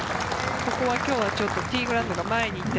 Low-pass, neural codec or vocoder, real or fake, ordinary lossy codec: none; none; real; none